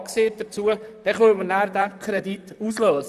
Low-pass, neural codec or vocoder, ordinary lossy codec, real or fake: 14.4 kHz; vocoder, 44.1 kHz, 128 mel bands, Pupu-Vocoder; none; fake